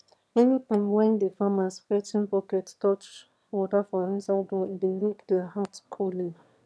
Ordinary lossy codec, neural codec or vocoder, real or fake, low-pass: none; autoencoder, 22.05 kHz, a latent of 192 numbers a frame, VITS, trained on one speaker; fake; none